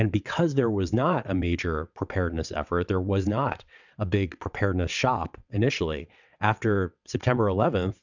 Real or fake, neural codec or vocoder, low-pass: fake; vocoder, 22.05 kHz, 80 mel bands, WaveNeXt; 7.2 kHz